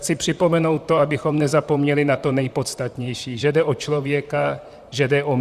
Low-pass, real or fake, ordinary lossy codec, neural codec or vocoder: 14.4 kHz; fake; Opus, 64 kbps; vocoder, 44.1 kHz, 128 mel bands, Pupu-Vocoder